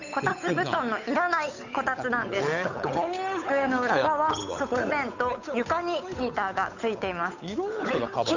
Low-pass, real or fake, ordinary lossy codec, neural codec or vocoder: 7.2 kHz; fake; none; codec, 16 kHz, 8 kbps, FunCodec, trained on Chinese and English, 25 frames a second